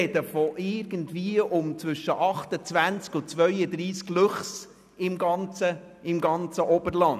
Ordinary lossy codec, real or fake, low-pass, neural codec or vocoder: none; real; 14.4 kHz; none